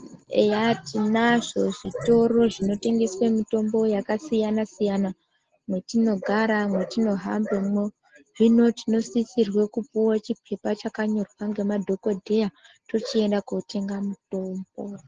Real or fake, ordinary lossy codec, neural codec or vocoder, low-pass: real; Opus, 16 kbps; none; 7.2 kHz